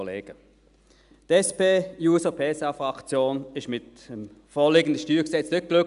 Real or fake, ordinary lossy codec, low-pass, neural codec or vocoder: real; none; 10.8 kHz; none